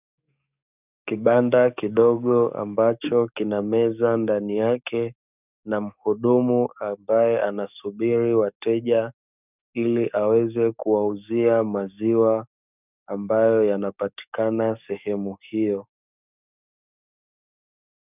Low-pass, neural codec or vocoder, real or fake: 3.6 kHz; codec, 44.1 kHz, 7.8 kbps, DAC; fake